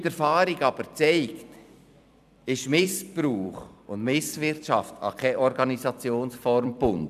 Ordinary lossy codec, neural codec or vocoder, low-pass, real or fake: none; vocoder, 44.1 kHz, 128 mel bands every 256 samples, BigVGAN v2; 14.4 kHz; fake